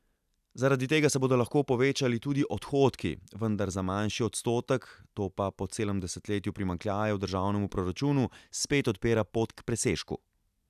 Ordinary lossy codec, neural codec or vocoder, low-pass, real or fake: none; none; 14.4 kHz; real